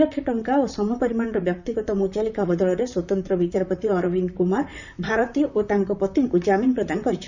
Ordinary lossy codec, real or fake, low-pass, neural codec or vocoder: none; fake; 7.2 kHz; vocoder, 44.1 kHz, 128 mel bands, Pupu-Vocoder